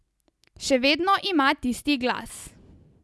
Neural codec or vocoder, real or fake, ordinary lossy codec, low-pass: none; real; none; none